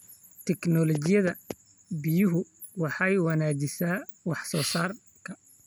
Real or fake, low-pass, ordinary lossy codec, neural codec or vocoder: real; none; none; none